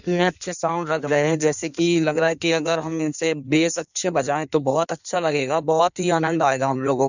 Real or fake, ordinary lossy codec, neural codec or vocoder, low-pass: fake; none; codec, 16 kHz in and 24 kHz out, 1.1 kbps, FireRedTTS-2 codec; 7.2 kHz